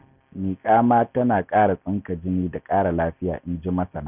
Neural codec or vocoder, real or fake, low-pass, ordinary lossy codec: none; real; 3.6 kHz; none